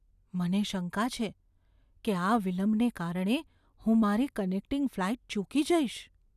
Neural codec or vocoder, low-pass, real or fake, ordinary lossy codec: none; 14.4 kHz; real; none